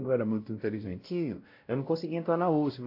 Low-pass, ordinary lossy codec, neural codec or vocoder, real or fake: 5.4 kHz; AAC, 32 kbps; codec, 16 kHz, 0.5 kbps, X-Codec, WavLM features, trained on Multilingual LibriSpeech; fake